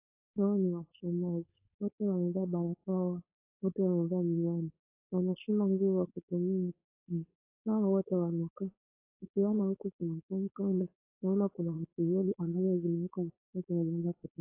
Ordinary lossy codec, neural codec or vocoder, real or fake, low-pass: AAC, 24 kbps; codec, 16 kHz, 4.8 kbps, FACodec; fake; 3.6 kHz